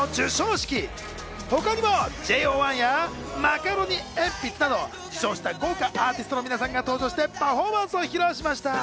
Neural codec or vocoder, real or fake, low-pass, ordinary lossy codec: none; real; none; none